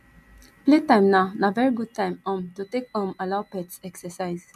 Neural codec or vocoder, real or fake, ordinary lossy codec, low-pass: none; real; none; 14.4 kHz